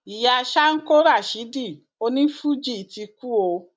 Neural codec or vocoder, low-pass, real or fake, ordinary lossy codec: none; none; real; none